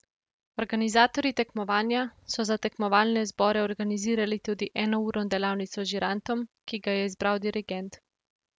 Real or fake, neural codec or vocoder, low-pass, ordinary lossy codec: real; none; none; none